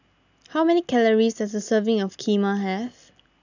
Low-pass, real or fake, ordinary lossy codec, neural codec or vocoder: 7.2 kHz; real; none; none